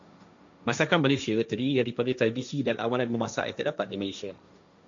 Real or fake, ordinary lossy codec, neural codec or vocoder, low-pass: fake; MP3, 64 kbps; codec, 16 kHz, 1.1 kbps, Voila-Tokenizer; 7.2 kHz